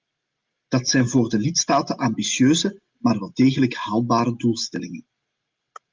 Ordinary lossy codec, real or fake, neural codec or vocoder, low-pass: Opus, 32 kbps; real; none; 7.2 kHz